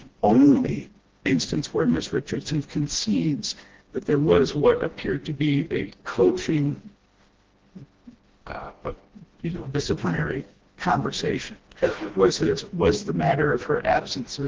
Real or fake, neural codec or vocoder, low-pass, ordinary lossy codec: fake; codec, 16 kHz, 1 kbps, FreqCodec, smaller model; 7.2 kHz; Opus, 16 kbps